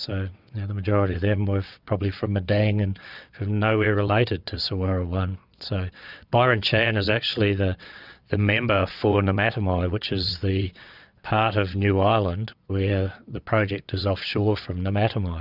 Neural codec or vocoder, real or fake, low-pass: vocoder, 22.05 kHz, 80 mel bands, WaveNeXt; fake; 5.4 kHz